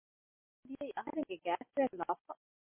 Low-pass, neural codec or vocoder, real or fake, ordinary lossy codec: 3.6 kHz; none; real; MP3, 24 kbps